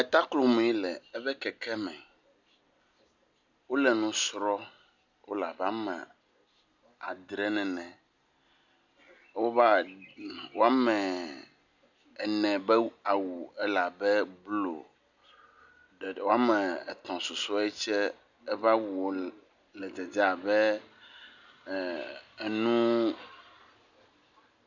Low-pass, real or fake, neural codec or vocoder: 7.2 kHz; real; none